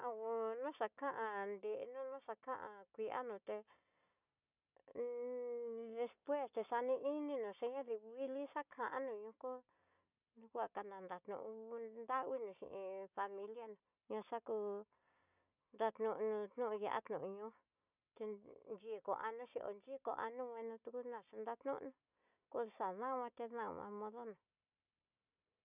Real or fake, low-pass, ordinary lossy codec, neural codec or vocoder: real; 3.6 kHz; none; none